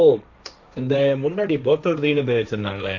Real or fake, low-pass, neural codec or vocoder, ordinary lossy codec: fake; 7.2 kHz; codec, 16 kHz, 1.1 kbps, Voila-Tokenizer; none